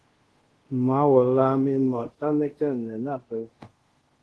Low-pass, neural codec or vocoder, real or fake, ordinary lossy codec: 10.8 kHz; codec, 24 kHz, 0.5 kbps, DualCodec; fake; Opus, 16 kbps